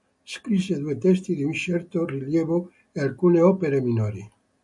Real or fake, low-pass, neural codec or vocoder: real; 10.8 kHz; none